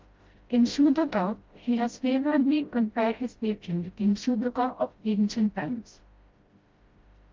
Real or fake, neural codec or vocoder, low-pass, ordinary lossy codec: fake; codec, 16 kHz, 0.5 kbps, FreqCodec, smaller model; 7.2 kHz; Opus, 32 kbps